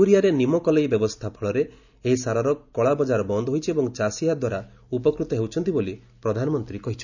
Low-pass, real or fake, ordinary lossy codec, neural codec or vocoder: 7.2 kHz; real; none; none